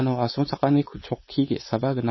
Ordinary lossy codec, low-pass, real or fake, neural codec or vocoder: MP3, 24 kbps; 7.2 kHz; fake; codec, 16 kHz, 4.8 kbps, FACodec